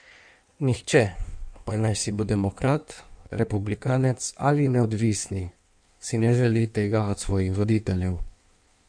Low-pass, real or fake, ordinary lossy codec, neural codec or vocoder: 9.9 kHz; fake; none; codec, 16 kHz in and 24 kHz out, 1.1 kbps, FireRedTTS-2 codec